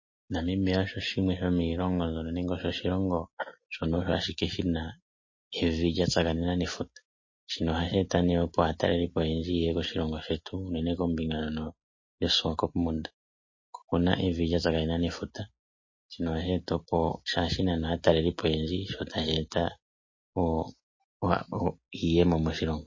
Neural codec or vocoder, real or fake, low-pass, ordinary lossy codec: none; real; 7.2 kHz; MP3, 32 kbps